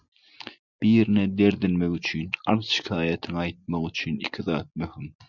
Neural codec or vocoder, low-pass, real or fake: none; 7.2 kHz; real